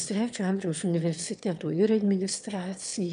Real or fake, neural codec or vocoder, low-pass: fake; autoencoder, 22.05 kHz, a latent of 192 numbers a frame, VITS, trained on one speaker; 9.9 kHz